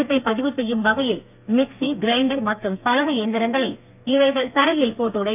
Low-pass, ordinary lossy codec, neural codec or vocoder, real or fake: 3.6 kHz; none; codec, 32 kHz, 1.9 kbps, SNAC; fake